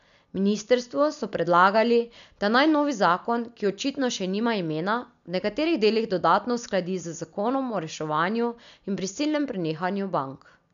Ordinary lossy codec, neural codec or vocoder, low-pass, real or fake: none; none; 7.2 kHz; real